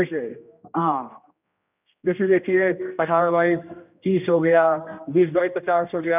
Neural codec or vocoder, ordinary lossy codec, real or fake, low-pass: codec, 16 kHz, 1 kbps, X-Codec, HuBERT features, trained on general audio; none; fake; 3.6 kHz